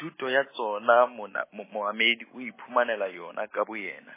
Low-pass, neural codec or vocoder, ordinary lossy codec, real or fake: 3.6 kHz; none; MP3, 16 kbps; real